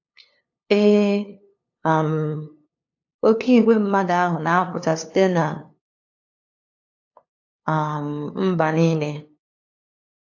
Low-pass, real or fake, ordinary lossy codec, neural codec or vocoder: 7.2 kHz; fake; AAC, 48 kbps; codec, 16 kHz, 2 kbps, FunCodec, trained on LibriTTS, 25 frames a second